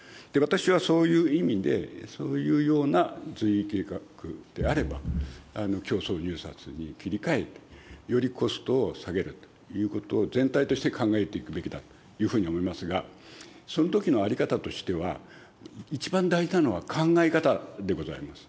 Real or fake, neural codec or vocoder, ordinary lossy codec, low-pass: real; none; none; none